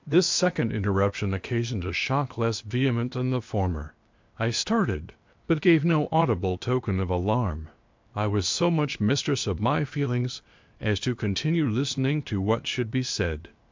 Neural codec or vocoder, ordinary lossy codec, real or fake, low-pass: codec, 16 kHz, 0.8 kbps, ZipCodec; MP3, 64 kbps; fake; 7.2 kHz